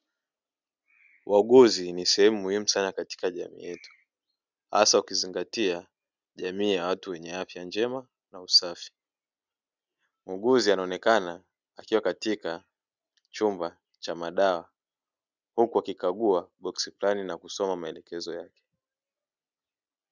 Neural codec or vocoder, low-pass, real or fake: none; 7.2 kHz; real